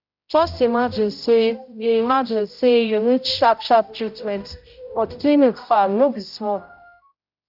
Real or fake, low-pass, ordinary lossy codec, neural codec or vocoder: fake; 5.4 kHz; none; codec, 16 kHz, 0.5 kbps, X-Codec, HuBERT features, trained on general audio